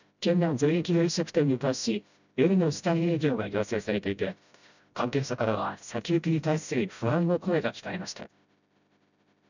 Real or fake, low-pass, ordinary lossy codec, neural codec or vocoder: fake; 7.2 kHz; none; codec, 16 kHz, 0.5 kbps, FreqCodec, smaller model